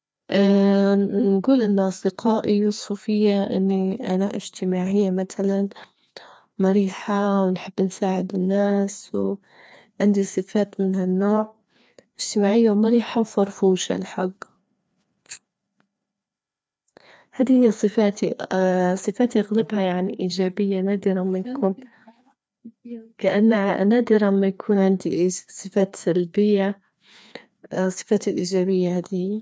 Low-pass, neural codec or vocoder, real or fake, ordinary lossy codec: none; codec, 16 kHz, 2 kbps, FreqCodec, larger model; fake; none